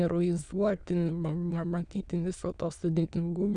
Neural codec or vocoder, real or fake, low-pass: autoencoder, 22.05 kHz, a latent of 192 numbers a frame, VITS, trained on many speakers; fake; 9.9 kHz